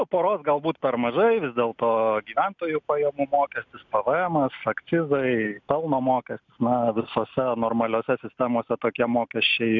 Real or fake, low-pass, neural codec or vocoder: real; 7.2 kHz; none